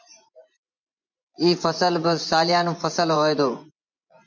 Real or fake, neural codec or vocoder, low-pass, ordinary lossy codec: fake; vocoder, 24 kHz, 100 mel bands, Vocos; 7.2 kHz; MP3, 64 kbps